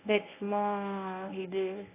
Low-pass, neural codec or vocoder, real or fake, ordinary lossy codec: 3.6 kHz; codec, 24 kHz, 0.9 kbps, WavTokenizer, medium speech release version 1; fake; AAC, 16 kbps